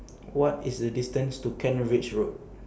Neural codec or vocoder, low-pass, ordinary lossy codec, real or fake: none; none; none; real